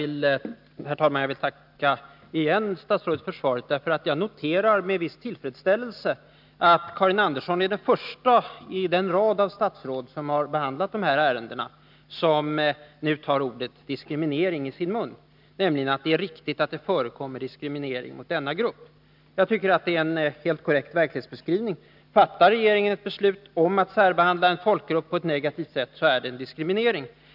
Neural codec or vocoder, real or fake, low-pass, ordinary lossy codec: none; real; 5.4 kHz; none